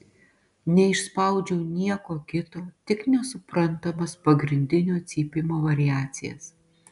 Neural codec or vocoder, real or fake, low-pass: none; real; 10.8 kHz